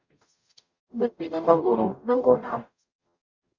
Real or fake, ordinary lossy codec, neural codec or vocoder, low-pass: fake; Opus, 64 kbps; codec, 44.1 kHz, 0.9 kbps, DAC; 7.2 kHz